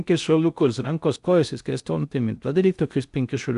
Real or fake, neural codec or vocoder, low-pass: fake; codec, 16 kHz in and 24 kHz out, 0.6 kbps, FocalCodec, streaming, 4096 codes; 10.8 kHz